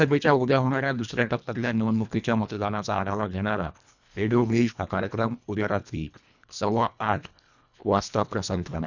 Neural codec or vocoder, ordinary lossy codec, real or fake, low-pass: codec, 24 kHz, 1.5 kbps, HILCodec; none; fake; 7.2 kHz